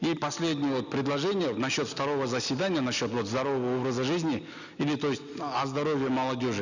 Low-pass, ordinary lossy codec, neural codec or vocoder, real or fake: 7.2 kHz; none; none; real